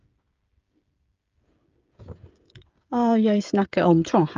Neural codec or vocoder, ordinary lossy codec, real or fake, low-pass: codec, 16 kHz, 16 kbps, FreqCodec, smaller model; Opus, 24 kbps; fake; 7.2 kHz